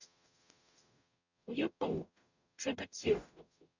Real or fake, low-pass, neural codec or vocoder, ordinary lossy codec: fake; 7.2 kHz; codec, 44.1 kHz, 0.9 kbps, DAC; none